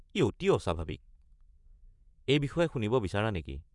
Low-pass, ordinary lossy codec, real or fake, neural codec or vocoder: 10.8 kHz; none; fake; vocoder, 24 kHz, 100 mel bands, Vocos